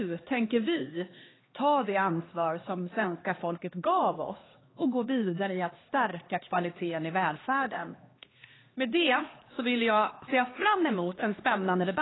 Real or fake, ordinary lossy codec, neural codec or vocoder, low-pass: fake; AAC, 16 kbps; codec, 16 kHz, 2 kbps, X-Codec, HuBERT features, trained on LibriSpeech; 7.2 kHz